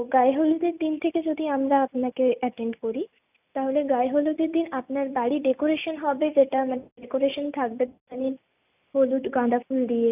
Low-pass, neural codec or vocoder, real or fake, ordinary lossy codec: 3.6 kHz; none; real; none